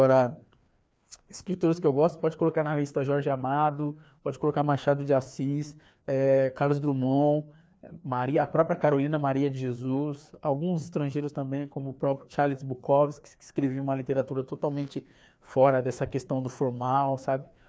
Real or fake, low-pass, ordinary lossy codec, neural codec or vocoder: fake; none; none; codec, 16 kHz, 2 kbps, FreqCodec, larger model